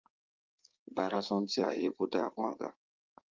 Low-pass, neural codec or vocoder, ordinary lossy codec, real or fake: 7.2 kHz; codec, 16 kHz in and 24 kHz out, 2.2 kbps, FireRedTTS-2 codec; Opus, 24 kbps; fake